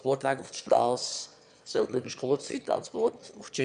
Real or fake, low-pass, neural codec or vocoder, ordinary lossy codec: fake; 9.9 kHz; autoencoder, 22.05 kHz, a latent of 192 numbers a frame, VITS, trained on one speaker; none